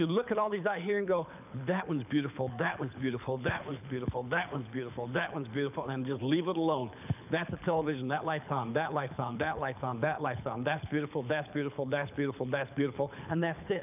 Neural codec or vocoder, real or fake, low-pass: codec, 24 kHz, 6 kbps, HILCodec; fake; 3.6 kHz